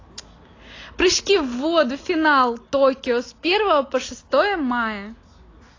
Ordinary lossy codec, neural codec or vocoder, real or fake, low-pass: AAC, 32 kbps; none; real; 7.2 kHz